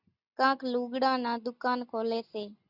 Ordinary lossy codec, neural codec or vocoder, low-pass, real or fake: Opus, 64 kbps; none; 5.4 kHz; real